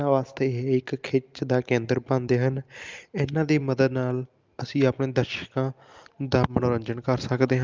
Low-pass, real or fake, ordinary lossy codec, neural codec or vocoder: 7.2 kHz; real; Opus, 32 kbps; none